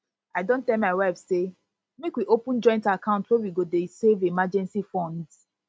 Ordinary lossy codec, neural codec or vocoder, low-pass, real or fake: none; none; none; real